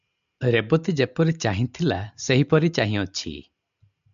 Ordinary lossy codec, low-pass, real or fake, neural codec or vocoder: AAC, 96 kbps; 7.2 kHz; real; none